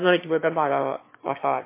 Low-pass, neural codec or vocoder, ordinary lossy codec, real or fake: 3.6 kHz; autoencoder, 22.05 kHz, a latent of 192 numbers a frame, VITS, trained on one speaker; MP3, 24 kbps; fake